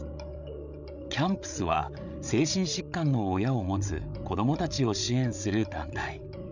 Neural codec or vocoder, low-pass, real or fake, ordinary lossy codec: codec, 16 kHz, 8 kbps, FreqCodec, larger model; 7.2 kHz; fake; none